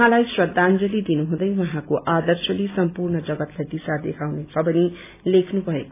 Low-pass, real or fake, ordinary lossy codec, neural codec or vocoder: 3.6 kHz; real; AAC, 24 kbps; none